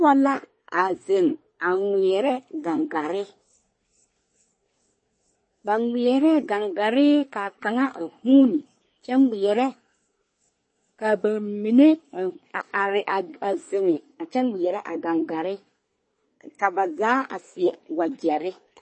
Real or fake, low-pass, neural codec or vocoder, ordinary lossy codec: fake; 9.9 kHz; codec, 24 kHz, 1 kbps, SNAC; MP3, 32 kbps